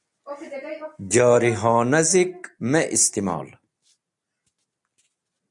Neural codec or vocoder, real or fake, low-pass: none; real; 10.8 kHz